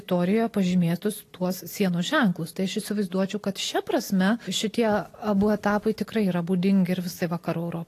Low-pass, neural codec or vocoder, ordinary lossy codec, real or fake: 14.4 kHz; none; AAC, 48 kbps; real